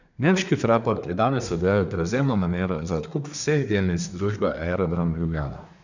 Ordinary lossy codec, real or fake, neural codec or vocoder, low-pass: none; fake; codec, 24 kHz, 1 kbps, SNAC; 7.2 kHz